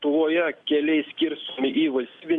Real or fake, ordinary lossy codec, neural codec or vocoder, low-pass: real; Opus, 64 kbps; none; 10.8 kHz